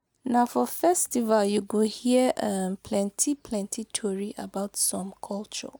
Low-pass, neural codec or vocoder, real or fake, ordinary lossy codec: none; none; real; none